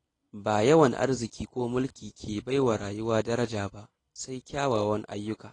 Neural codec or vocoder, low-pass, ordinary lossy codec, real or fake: none; 10.8 kHz; AAC, 32 kbps; real